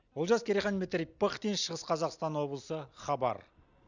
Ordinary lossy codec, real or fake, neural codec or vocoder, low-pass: none; real; none; 7.2 kHz